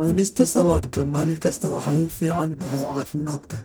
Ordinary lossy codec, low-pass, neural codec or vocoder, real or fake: none; none; codec, 44.1 kHz, 0.9 kbps, DAC; fake